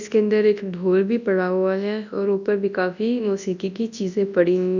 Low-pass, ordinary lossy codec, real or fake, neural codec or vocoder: 7.2 kHz; none; fake; codec, 24 kHz, 0.9 kbps, WavTokenizer, large speech release